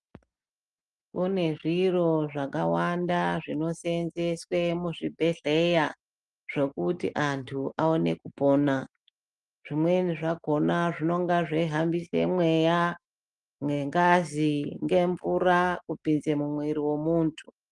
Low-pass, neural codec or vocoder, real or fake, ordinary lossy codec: 10.8 kHz; none; real; Opus, 32 kbps